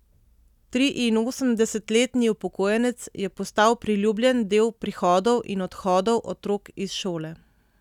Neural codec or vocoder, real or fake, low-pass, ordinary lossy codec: none; real; 19.8 kHz; none